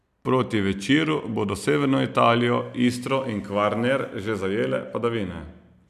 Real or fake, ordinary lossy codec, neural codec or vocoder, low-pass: real; none; none; 14.4 kHz